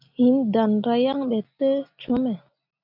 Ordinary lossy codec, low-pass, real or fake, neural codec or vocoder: MP3, 48 kbps; 5.4 kHz; real; none